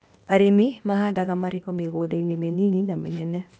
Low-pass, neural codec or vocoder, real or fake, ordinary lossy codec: none; codec, 16 kHz, 0.8 kbps, ZipCodec; fake; none